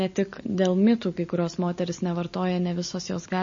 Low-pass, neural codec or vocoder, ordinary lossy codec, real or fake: 7.2 kHz; none; MP3, 32 kbps; real